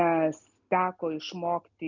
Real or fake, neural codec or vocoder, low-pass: real; none; 7.2 kHz